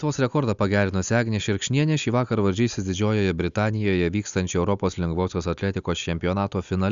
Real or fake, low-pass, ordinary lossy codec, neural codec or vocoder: real; 7.2 kHz; Opus, 64 kbps; none